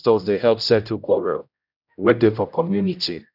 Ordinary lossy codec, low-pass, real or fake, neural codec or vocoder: none; 5.4 kHz; fake; codec, 16 kHz, 0.5 kbps, X-Codec, HuBERT features, trained on general audio